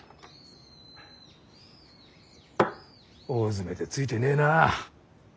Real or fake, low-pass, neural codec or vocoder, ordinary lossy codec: real; none; none; none